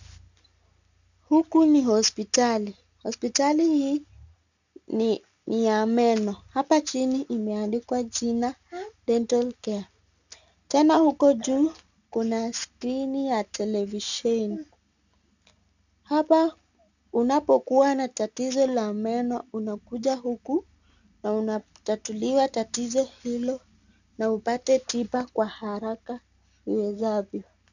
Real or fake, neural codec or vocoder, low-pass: real; none; 7.2 kHz